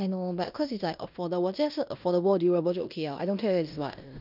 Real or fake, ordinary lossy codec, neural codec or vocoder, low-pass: fake; none; codec, 16 kHz in and 24 kHz out, 0.9 kbps, LongCat-Audio-Codec, fine tuned four codebook decoder; 5.4 kHz